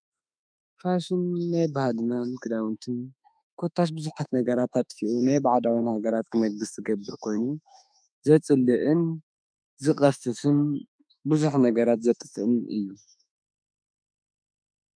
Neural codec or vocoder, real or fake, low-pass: autoencoder, 48 kHz, 32 numbers a frame, DAC-VAE, trained on Japanese speech; fake; 9.9 kHz